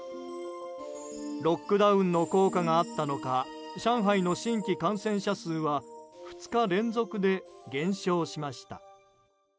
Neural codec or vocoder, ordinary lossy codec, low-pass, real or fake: none; none; none; real